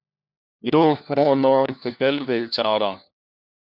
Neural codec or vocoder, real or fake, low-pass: codec, 16 kHz, 1 kbps, FunCodec, trained on LibriTTS, 50 frames a second; fake; 5.4 kHz